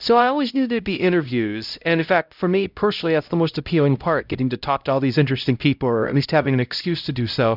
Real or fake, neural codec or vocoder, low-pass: fake; codec, 16 kHz, 0.5 kbps, X-Codec, HuBERT features, trained on LibriSpeech; 5.4 kHz